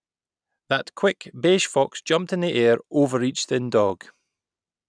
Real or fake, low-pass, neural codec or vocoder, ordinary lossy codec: real; 9.9 kHz; none; none